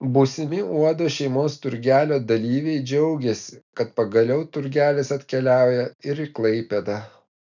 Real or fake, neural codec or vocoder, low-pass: real; none; 7.2 kHz